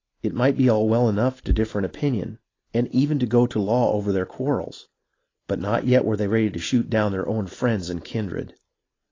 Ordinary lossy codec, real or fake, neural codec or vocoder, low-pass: AAC, 32 kbps; real; none; 7.2 kHz